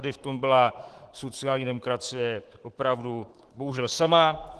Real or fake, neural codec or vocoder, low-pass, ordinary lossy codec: fake; codec, 24 kHz, 3.1 kbps, DualCodec; 10.8 kHz; Opus, 16 kbps